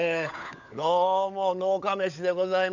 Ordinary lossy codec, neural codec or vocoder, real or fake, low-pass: none; codec, 16 kHz, 16 kbps, FunCodec, trained on LibriTTS, 50 frames a second; fake; 7.2 kHz